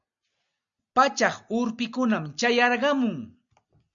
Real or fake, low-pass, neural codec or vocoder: real; 7.2 kHz; none